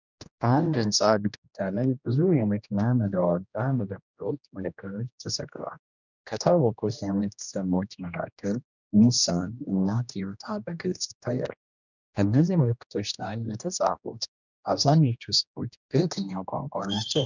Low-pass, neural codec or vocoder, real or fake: 7.2 kHz; codec, 16 kHz, 1 kbps, X-Codec, HuBERT features, trained on general audio; fake